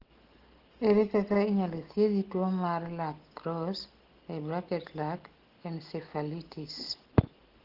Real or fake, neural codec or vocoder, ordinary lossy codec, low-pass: real; none; Opus, 16 kbps; 5.4 kHz